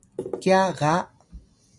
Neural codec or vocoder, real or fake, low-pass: none; real; 10.8 kHz